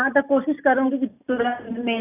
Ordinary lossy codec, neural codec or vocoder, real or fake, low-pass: none; none; real; 3.6 kHz